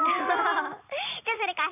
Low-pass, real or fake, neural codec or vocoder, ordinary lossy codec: 3.6 kHz; fake; vocoder, 44.1 kHz, 128 mel bands every 512 samples, BigVGAN v2; none